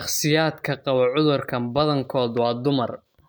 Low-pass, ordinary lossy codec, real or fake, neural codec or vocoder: none; none; real; none